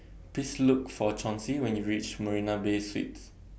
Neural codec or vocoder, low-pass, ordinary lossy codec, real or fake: none; none; none; real